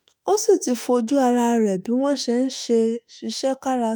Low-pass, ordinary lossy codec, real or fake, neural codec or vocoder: none; none; fake; autoencoder, 48 kHz, 32 numbers a frame, DAC-VAE, trained on Japanese speech